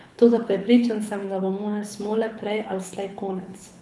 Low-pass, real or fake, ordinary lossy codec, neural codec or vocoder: none; fake; none; codec, 24 kHz, 6 kbps, HILCodec